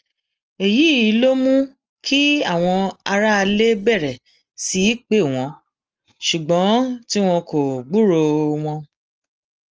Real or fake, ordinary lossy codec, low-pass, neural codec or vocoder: real; Opus, 24 kbps; 7.2 kHz; none